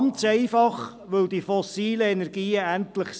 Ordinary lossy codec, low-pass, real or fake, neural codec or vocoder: none; none; real; none